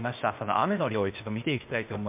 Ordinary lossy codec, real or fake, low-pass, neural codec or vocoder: MP3, 32 kbps; fake; 3.6 kHz; codec, 16 kHz, 0.8 kbps, ZipCodec